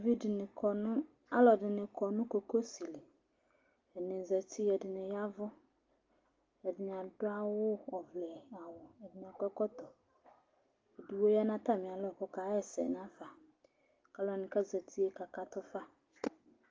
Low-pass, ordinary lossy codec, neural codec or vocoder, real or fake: 7.2 kHz; Opus, 32 kbps; none; real